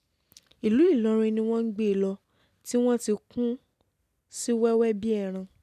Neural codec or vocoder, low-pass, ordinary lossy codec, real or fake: none; 14.4 kHz; none; real